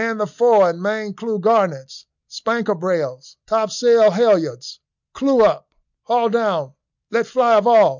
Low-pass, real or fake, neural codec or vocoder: 7.2 kHz; real; none